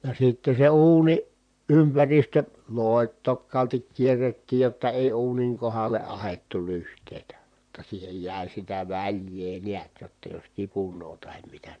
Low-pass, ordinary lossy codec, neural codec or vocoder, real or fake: 9.9 kHz; none; vocoder, 44.1 kHz, 128 mel bands, Pupu-Vocoder; fake